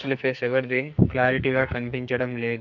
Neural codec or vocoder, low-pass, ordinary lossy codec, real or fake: codec, 44.1 kHz, 2.6 kbps, SNAC; 7.2 kHz; none; fake